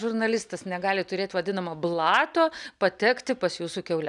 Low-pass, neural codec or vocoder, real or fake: 10.8 kHz; none; real